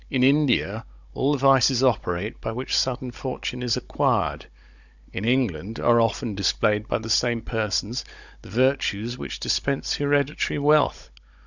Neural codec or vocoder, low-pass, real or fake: codec, 16 kHz, 16 kbps, FunCodec, trained on Chinese and English, 50 frames a second; 7.2 kHz; fake